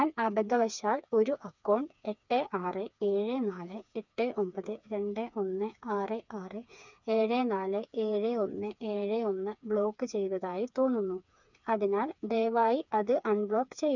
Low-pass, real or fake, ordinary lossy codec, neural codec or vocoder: 7.2 kHz; fake; none; codec, 16 kHz, 4 kbps, FreqCodec, smaller model